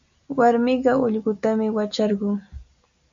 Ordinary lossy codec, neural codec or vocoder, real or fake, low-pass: MP3, 64 kbps; none; real; 7.2 kHz